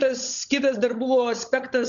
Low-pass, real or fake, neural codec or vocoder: 7.2 kHz; fake; codec, 16 kHz, 16 kbps, FunCodec, trained on LibriTTS, 50 frames a second